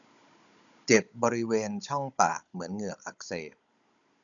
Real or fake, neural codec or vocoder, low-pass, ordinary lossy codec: fake; codec, 16 kHz, 16 kbps, FunCodec, trained on Chinese and English, 50 frames a second; 7.2 kHz; none